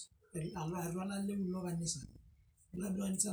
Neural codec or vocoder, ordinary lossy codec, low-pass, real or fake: none; none; none; real